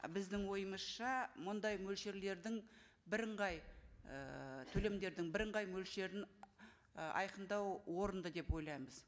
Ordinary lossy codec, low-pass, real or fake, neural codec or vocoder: none; none; real; none